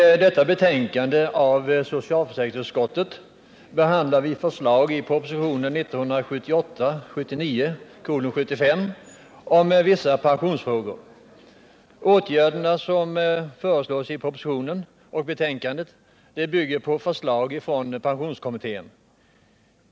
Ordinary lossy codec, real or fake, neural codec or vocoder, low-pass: none; real; none; none